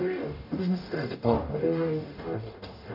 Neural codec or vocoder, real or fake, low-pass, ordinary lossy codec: codec, 44.1 kHz, 0.9 kbps, DAC; fake; 5.4 kHz; AAC, 48 kbps